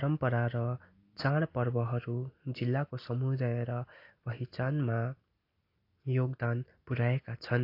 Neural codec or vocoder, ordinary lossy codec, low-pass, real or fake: none; AAC, 32 kbps; 5.4 kHz; real